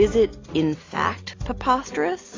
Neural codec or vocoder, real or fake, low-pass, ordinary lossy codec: none; real; 7.2 kHz; AAC, 32 kbps